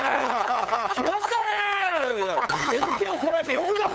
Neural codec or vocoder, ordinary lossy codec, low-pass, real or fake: codec, 16 kHz, 8 kbps, FunCodec, trained on LibriTTS, 25 frames a second; none; none; fake